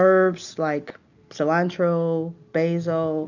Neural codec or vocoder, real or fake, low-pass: none; real; 7.2 kHz